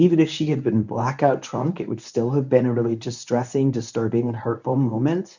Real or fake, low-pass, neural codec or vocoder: fake; 7.2 kHz; codec, 24 kHz, 0.9 kbps, WavTokenizer, medium speech release version 1